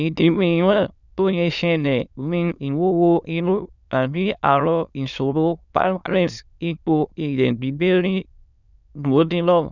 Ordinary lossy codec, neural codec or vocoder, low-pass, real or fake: none; autoencoder, 22.05 kHz, a latent of 192 numbers a frame, VITS, trained on many speakers; 7.2 kHz; fake